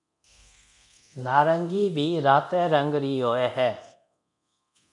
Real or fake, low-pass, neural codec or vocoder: fake; 10.8 kHz; codec, 24 kHz, 0.9 kbps, DualCodec